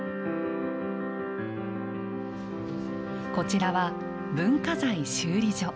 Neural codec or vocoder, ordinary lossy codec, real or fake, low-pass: none; none; real; none